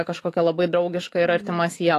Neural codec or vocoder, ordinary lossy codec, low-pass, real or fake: autoencoder, 48 kHz, 128 numbers a frame, DAC-VAE, trained on Japanese speech; AAC, 48 kbps; 14.4 kHz; fake